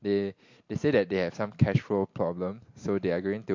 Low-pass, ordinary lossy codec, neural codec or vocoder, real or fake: 7.2 kHz; MP3, 48 kbps; none; real